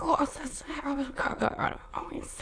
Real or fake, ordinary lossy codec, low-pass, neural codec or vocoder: fake; AAC, 48 kbps; 9.9 kHz; autoencoder, 22.05 kHz, a latent of 192 numbers a frame, VITS, trained on many speakers